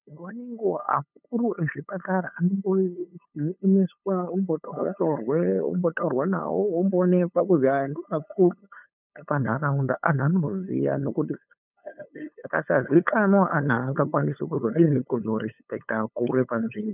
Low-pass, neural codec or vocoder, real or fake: 3.6 kHz; codec, 16 kHz, 8 kbps, FunCodec, trained on LibriTTS, 25 frames a second; fake